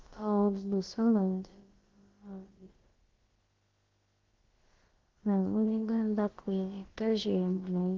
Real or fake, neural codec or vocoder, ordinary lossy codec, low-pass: fake; codec, 16 kHz, about 1 kbps, DyCAST, with the encoder's durations; Opus, 16 kbps; 7.2 kHz